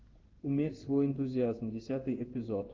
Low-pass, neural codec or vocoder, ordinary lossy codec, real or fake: 7.2 kHz; codec, 16 kHz in and 24 kHz out, 1 kbps, XY-Tokenizer; Opus, 16 kbps; fake